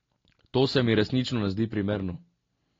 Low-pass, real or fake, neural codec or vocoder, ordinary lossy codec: 7.2 kHz; real; none; AAC, 32 kbps